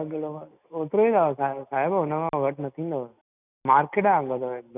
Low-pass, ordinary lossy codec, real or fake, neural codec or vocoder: 3.6 kHz; none; real; none